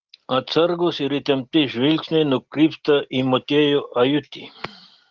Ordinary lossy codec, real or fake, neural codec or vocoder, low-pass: Opus, 16 kbps; real; none; 7.2 kHz